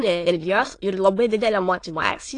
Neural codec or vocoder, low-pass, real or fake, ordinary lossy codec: autoencoder, 22.05 kHz, a latent of 192 numbers a frame, VITS, trained on many speakers; 9.9 kHz; fake; AAC, 48 kbps